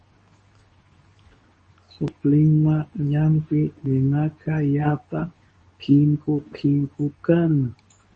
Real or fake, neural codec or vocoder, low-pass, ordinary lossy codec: fake; codec, 24 kHz, 0.9 kbps, WavTokenizer, medium speech release version 2; 10.8 kHz; MP3, 32 kbps